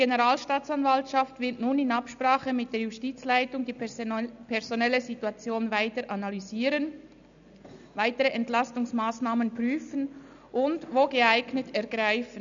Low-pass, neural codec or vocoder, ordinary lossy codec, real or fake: 7.2 kHz; none; none; real